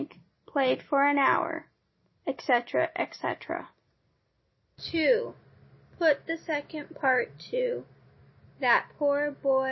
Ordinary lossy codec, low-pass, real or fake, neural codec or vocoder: MP3, 24 kbps; 7.2 kHz; real; none